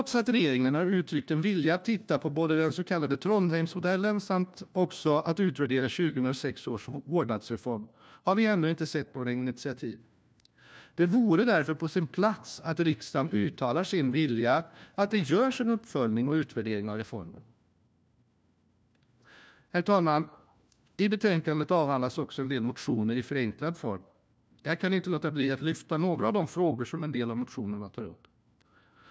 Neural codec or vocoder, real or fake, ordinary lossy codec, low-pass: codec, 16 kHz, 1 kbps, FunCodec, trained on LibriTTS, 50 frames a second; fake; none; none